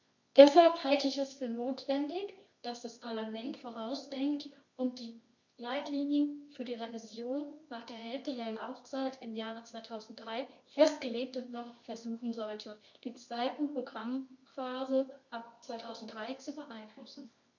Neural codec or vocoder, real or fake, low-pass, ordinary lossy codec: codec, 24 kHz, 0.9 kbps, WavTokenizer, medium music audio release; fake; 7.2 kHz; MP3, 48 kbps